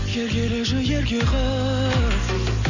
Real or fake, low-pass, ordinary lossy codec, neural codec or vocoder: real; 7.2 kHz; none; none